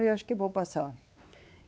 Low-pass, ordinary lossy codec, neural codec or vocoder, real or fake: none; none; codec, 16 kHz, 4 kbps, X-Codec, WavLM features, trained on Multilingual LibriSpeech; fake